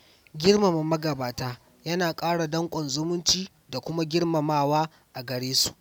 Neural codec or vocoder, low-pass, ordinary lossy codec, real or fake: none; 19.8 kHz; none; real